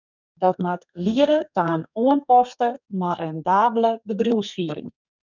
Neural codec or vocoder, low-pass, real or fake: codec, 32 kHz, 1.9 kbps, SNAC; 7.2 kHz; fake